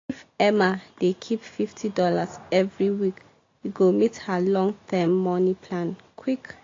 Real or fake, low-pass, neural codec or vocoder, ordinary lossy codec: real; 7.2 kHz; none; AAC, 32 kbps